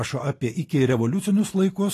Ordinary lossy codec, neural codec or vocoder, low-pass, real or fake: AAC, 48 kbps; none; 14.4 kHz; real